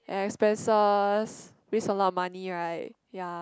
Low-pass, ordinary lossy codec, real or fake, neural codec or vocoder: none; none; real; none